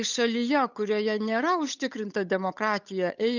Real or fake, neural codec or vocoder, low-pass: fake; codec, 16 kHz, 8 kbps, FunCodec, trained on Chinese and English, 25 frames a second; 7.2 kHz